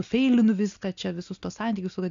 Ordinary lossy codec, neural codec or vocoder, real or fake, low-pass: MP3, 64 kbps; none; real; 7.2 kHz